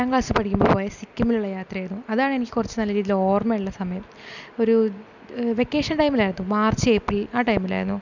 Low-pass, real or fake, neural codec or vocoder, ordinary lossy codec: 7.2 kHz; real; none; none